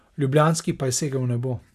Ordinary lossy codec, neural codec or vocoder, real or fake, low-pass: none; none; real; 14.4 kHz